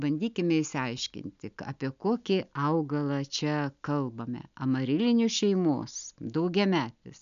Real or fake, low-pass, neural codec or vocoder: real; 7.2 kHz; none